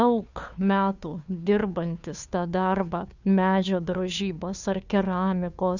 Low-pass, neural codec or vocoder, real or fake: 7.2 kHz; codec, 16 kHz, 2 kbps, FunCodec, trained on Chinese and English, 25 frames a second; fake